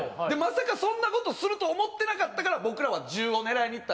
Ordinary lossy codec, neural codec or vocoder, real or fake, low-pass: none; none; real; none